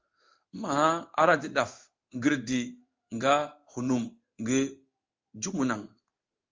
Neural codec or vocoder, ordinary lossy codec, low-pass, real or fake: codec, 16 kHz in and 24 kHz out, 1 kbps, XY-Tokenizer; Opus, 32 kbps; 7.2 kHz; fake